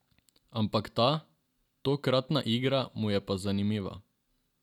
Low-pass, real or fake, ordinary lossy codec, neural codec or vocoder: 19.8 kHz; real; none; none